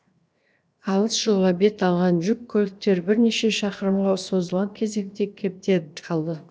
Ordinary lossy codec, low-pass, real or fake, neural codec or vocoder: none; none; fake; codec, 16 kHz, 0.7 kbps, FocalCodec